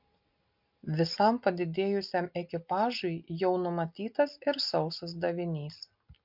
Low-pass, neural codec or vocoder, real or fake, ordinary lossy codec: 5.4 kHz; none; real; MP3, 48 kbps